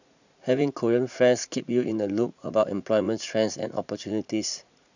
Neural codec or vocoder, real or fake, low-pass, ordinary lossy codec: vocoder, 44.1 kHz, 80 mel bands, Vocos; fake; 7.2 kHz; none